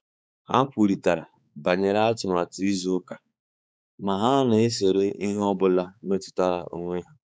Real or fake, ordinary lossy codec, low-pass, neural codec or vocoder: fake; none; none; codec, 16 kHz, 4 kbps, X-Codec, HuBERT features, trained on balanced general audio